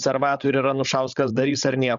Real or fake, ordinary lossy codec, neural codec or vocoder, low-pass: fake; Opus, 64 kbps; codec, 16 kHz, 16 kbps, FreqCodec, larger model; 7.2 kHz